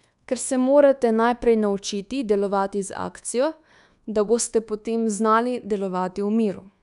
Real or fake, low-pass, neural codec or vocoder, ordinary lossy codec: fake; 10.8 kHz; codec, 24 kHz, 1.2 kbps, DualCodec; none